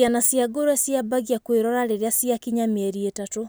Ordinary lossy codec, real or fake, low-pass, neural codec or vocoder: none; real; none; none